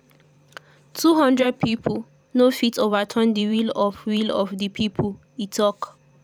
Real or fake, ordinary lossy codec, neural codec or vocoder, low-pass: fake; none; vocoder, 44.1 kHz, 128 mel bands every 512 samples, BigVGAN v2; 19.8 kHz